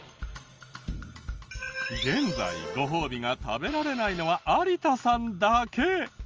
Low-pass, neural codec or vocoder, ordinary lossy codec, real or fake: 7.2 kHz; none; Opus, 24 kbps; real